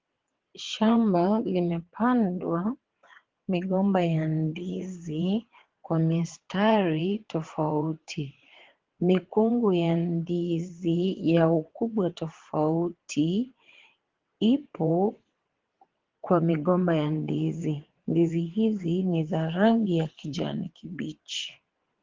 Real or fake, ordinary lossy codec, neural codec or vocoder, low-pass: fake; Opus, 16 kbps; vocoder, 22.05 kHz, 80 mel bands, WaveNeXt; 7.2 kHz